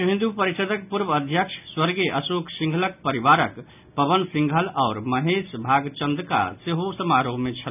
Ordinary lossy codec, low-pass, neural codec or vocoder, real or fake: none; 3.6 kHz; none; real